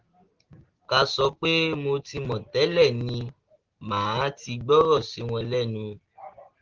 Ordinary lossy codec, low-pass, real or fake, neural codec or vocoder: Opus, 16 kbps; 7.2 kHz; real; none